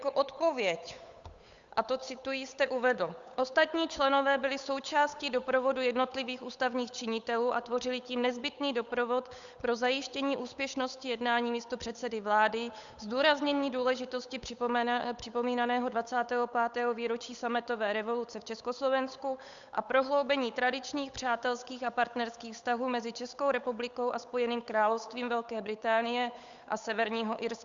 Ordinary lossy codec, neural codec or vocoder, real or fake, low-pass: Opus, 64 kbps; codec, 16 kHz, 8 kbps, FunCodec, trained on Chinese and English, 25 frames a second; fake; 7.2 kHz